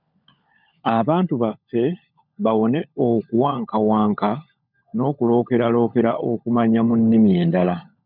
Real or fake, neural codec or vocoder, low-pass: fake; codec, 16 kHz, 16 kbps, FunCodec, trained on LibriTTS, 50 frames a second; 5.4 kHz